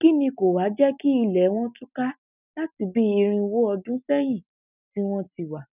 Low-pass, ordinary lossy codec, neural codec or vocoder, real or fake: 3.6 kHz; none; none; real